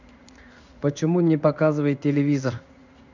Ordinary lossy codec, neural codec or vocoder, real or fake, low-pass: none; codec, 16 kHz in and 24 kHz out, 1 kbps, XY-Tokenizer; fake; 7.2 kHz